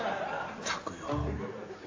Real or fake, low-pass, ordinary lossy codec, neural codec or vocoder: real; 7.2 kHz; AAC, 32 kbps; none